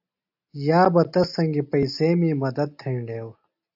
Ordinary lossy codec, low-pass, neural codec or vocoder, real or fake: AAC, 48 kbps; 5.4 kHz; none; real